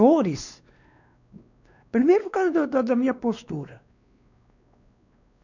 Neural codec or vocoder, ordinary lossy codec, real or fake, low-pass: codec, 16 kHz, 2 kbps, X-Codec, WavLM features, trained on Multilingual LibriSpeech; none; fake; 7.2 kHz